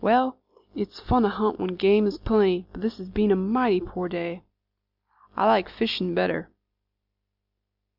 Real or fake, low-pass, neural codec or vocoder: real; 5.4 kHz; none